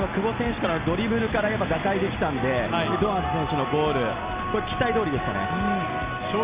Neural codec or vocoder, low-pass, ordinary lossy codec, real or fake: none; 3.6 kHz; Opus, 64 kbps; real